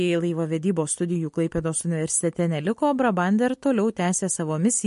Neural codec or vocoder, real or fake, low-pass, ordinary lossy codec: none; real; 14.4 kHz; MP3, 48 kbps